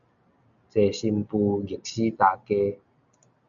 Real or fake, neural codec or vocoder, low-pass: real; none; 7.2 kHz